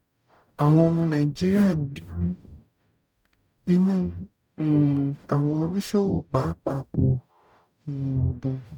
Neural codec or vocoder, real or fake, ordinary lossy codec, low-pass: codec, 44.1 kHz, 0.9 kbps, DAC; fake; none; 19.8 kHz